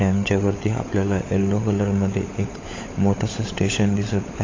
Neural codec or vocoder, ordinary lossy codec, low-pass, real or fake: codec, 16 kHz, 16 kbps, FunCodec, trained on LibriTTS, 50 frames a second; AAC, 32 kbps; 7.2 kHz; fake